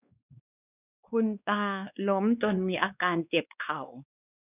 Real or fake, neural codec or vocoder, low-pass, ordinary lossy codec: fake; codec, 16 kHz, 2 kbps, X-Codec, WavLM features, trained on Multilingual LibriSpeech; 3.6 kHz; none